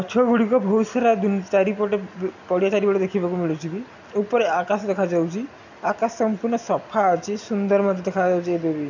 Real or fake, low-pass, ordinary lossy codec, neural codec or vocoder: real; 7.2 kHz; none; none